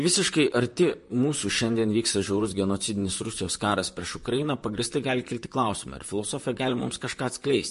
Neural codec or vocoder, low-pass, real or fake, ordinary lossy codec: vocoder, 44.1 kHz, 128 mel bands, Pupu-Vocoder; 14.4 kHz; fake; MP3, 48 kbps